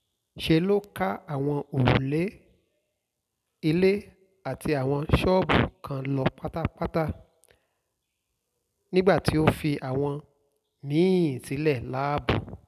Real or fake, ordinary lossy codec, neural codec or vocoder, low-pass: real; none; none; 14.4 kHz